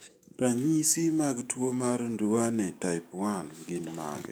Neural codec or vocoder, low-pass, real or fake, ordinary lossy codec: codec, 44.1 kHz, 7.8 kbps, DAC; none; fake; none